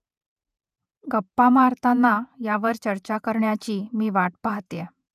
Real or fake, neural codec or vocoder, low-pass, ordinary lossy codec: fake; vocoder, 48 kHz, 128 mel bands, Vocos; 14.4 kHz; none